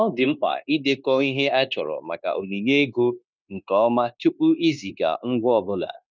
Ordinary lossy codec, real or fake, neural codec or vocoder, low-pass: none; fake; codec, 16 kHz, 0.9 kbps, LongCat-Audio-Codec; none